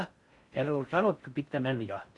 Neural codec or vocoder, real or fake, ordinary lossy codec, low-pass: codec, 16 kHz in and 24 kHz out, 0.6 kbps, FocalCodec, streaming, 4096 codes; fake; Opus, 64 kbps; 10.8 kHz